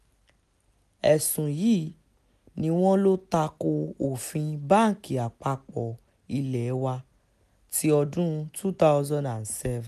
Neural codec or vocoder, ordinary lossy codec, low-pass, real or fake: none; none; 14.4 kHz; real